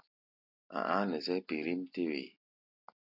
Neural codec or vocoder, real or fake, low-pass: none; real; 5.4 kHz